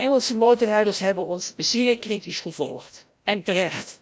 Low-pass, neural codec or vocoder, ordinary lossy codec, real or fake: none; codec, 16 kHz, 0.5 kbps, FreqCodec, larger model; none; fake